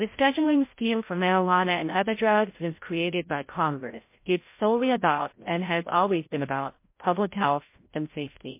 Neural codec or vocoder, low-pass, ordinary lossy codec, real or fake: codec, 16 kHz, 0.5 kbps, FreqCodec, larger model; 3.6 kHz; MP3, 24 kbps; fake